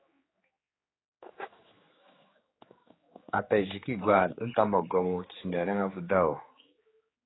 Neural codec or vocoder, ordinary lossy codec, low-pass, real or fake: codec, 16 kHz, 4 kbps, X-Codec, HuBERT features, trained on general audio; AAC, 16 kbps; 7.2 kHz; fake